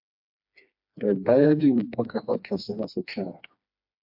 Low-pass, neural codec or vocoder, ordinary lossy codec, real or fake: 5.4 kHz; codec, 16 kHz, 2 kbps, FreqCodec, smaller model; MP3, 48 kbps; fake